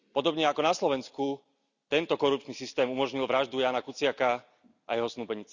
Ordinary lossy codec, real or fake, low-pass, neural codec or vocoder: none; real; 7.2 kHz; none